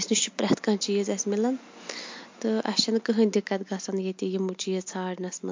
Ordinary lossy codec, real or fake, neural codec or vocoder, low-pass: MP3, 48 kbps; real; none; 7.2 kHz